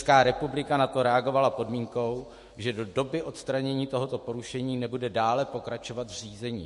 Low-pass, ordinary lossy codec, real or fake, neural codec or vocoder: 14.4 kHz; MP3, 48 kbps; fake; autoencoder, 48 kHz, 128 numbers a frame, DAC-VAE, trained on Japanese speech